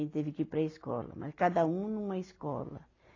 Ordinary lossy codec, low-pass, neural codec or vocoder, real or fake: AAC, 32 kbps; 7.2 kHz; none; real